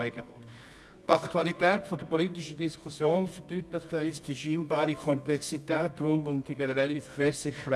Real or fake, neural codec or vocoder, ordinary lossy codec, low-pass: fake; codec, 24 kHz, 0.9 kbps, WavTokenizer, medium music audio release; none; none